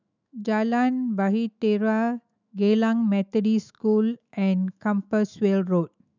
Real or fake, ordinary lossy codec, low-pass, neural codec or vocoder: fake; none; 7.2 kHz; autoencoder, 48 kHz, 128 numbers a frame, DAC-VAE, trained on Japanese speech